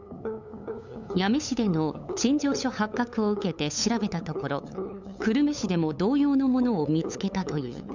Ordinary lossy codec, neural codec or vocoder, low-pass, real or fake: none; codec, 16 kHz, 8 kbps, FunCodec, trained on LibriTTS, 25 frames a second; 7.2 kHz; fake